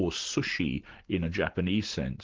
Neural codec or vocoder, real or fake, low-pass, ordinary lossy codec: none; real; 7.2 kHz; Opus, 16 kbps